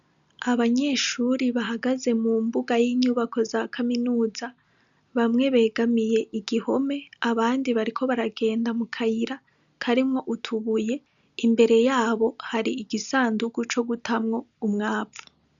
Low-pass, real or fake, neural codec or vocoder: 7.2 kHz; real; none